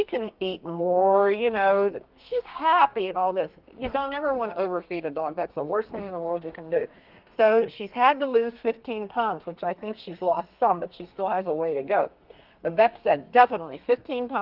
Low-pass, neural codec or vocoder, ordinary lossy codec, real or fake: 5.4 kHz; codec, 32 kHz, 1.9 kbps, SNAC; Opus, 32 kbps; fake